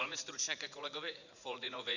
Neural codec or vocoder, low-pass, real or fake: vocoder, 44.1 kHz, 80 mel bands, Vocos; 7.2 kHz; fake